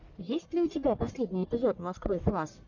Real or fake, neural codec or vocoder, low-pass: fake; codec, 44.1 kHz, 1.7 kbps, Pupu-Codec; 7.2 kHz